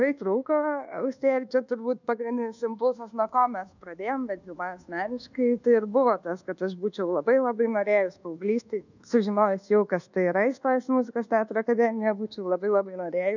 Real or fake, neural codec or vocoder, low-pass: fake; codec, 24 kHz, 1.2 kbps, DualCodec; 7.2 kHz